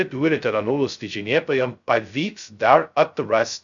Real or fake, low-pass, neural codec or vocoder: fake; 7.2 kHz; codec, 16 kHz, 0.2 kbps, FocalCodec